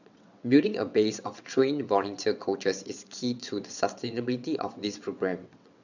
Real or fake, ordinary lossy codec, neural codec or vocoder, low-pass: fake; none; vocoder, 22.05 kHz, 80 mel bands, WaveNeXt; 7.2 kHz